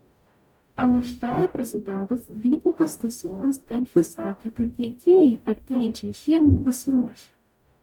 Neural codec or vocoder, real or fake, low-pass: codec, 44.1 kHz, 0.9 kbps, DAC; fake; 19.8 kHz